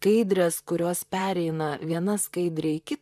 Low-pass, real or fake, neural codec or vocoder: 14.4 kHz; fake; vocoder, 44.1 kHz, 128 mel bands, Pupu-Vocoder